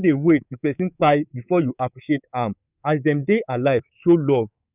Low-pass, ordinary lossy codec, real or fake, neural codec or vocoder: 3.6 kHz; none; fake; codec, 24 kHz, 3.1 kbps, DualCodec